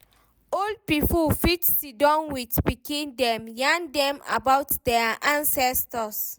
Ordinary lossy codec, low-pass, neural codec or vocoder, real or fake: none; none; none; real